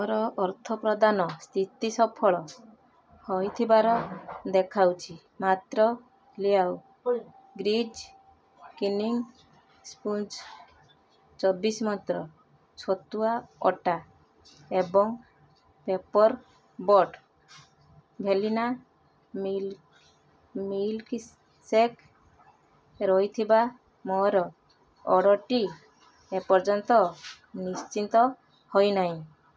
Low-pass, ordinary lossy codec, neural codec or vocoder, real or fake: none; none; none; real